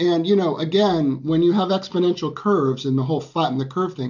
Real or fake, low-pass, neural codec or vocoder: real; 7.2 kHz; none